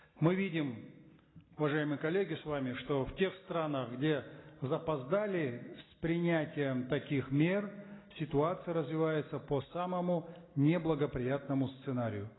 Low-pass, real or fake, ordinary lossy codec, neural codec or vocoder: 7.2 kHz; real; AAC, 16 kbps; none